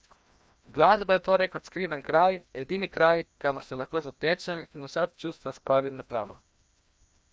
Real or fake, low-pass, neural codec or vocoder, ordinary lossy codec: fake; none; codec, 16 kHz, 1 kbps, FreqCodec, larger model; none